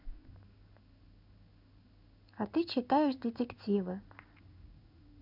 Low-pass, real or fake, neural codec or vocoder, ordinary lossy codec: 5.4 kHz; fake; codec, 16 kHz in and 24 kHz out, 1 kbps, XY-Tokenizer; none